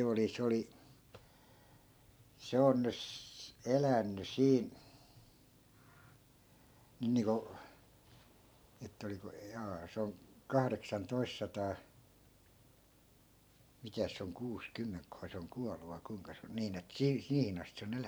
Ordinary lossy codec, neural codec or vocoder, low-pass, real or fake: none; vocoder, 44.1 kHz, 128 mel bands every 512 samples, BigVGAN v2; none; fake